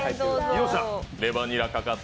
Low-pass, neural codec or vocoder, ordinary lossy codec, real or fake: none; none; none; real